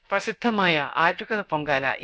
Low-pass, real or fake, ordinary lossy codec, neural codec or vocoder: none; fake; none; codec, 16 kHz, about 1 kbps, DyCAST, with the encoder's durations